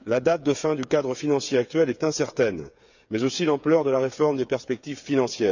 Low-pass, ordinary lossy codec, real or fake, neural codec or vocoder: 7.2 kHz; none; fake; codec, 16 kHz, 8 kbps, FreqCodec, smaller model